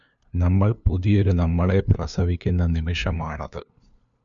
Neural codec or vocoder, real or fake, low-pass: codec, 16 kHz, 2 kbps, FunCodec, trained on LibriTTS, 25 frames a second; fake; 7.2 kHz